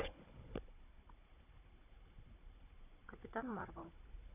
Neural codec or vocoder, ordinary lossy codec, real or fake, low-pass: codec, 16 kHz, 16 kbps, FunCodec, trained on Chinese and English, 50 frames a second; none; fake; 3.6 kHz